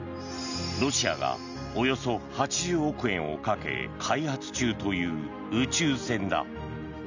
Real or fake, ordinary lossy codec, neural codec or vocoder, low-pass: real; none; none; 7.2 kHz